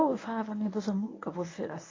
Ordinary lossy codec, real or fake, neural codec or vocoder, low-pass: AAC, 32 kbps; fake; codec, 24 kHz, 0.9 kbps, WavTokenizer, medium speech release version 1; 7.2 kHz